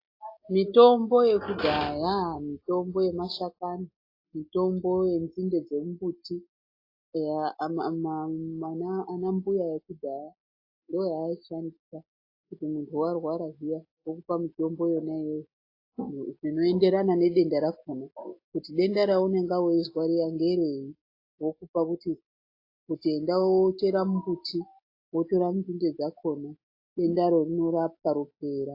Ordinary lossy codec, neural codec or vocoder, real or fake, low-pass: AAC, 32 kbps; none; real; 5.4 kHz